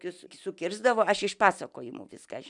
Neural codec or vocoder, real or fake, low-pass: none; real; 10.8 kHz